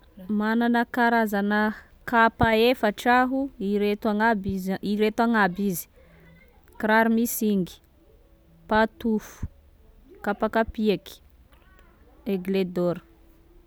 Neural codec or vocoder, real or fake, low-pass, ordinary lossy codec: none; real; none; none